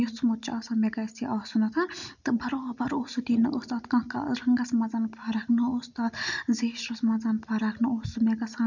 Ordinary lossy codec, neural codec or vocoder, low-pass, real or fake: none; none; 7.2 kHz; real